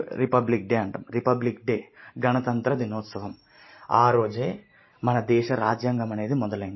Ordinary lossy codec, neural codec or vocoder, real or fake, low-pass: MP3, 24 kbps; none; real; 7.2 kHz